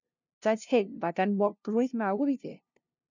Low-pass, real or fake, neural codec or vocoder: 7.2 kHz; fake; codec, 16 kHz, 0.5 kbps, FunCodec, trained on LibriTTS, 25 frames a second